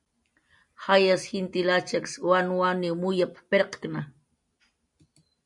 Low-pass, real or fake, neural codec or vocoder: 10.8 kHz; real; none